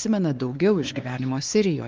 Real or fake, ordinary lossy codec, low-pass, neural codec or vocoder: fake; Opus, 32 kbps; 7.2 kHz; codec, 16 kHz, 2 kbps, X-Codec, WavLM features, trained on Multilingual LibriSpeech